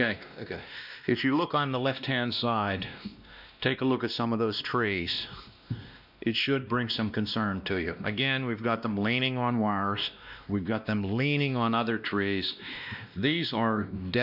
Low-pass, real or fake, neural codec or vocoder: 5.4 kHz; fake; codec, 16 kHz, 1 kbps, X-Codec, WavLM features, trained on Multilingual LibriSpeech